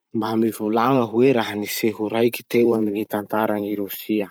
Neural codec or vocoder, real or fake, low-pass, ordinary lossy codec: vocoder, 44.1 kHz, 128 mel bands every 512 samples, BigVGAN v2; fake; none; none